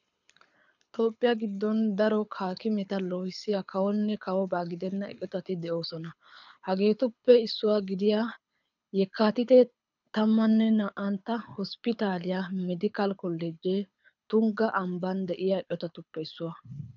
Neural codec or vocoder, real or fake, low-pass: codec, 24 kHz, 6 kbps, HILCodec; fake; 7.2 kHz